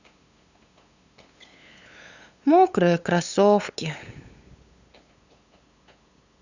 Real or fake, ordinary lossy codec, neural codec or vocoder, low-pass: fake; Opus, 64 kbps; codec, 16 kHz, 8 kbps, FunCodec, trained on LibriTTS, 25 frames a second; 7.2 kHz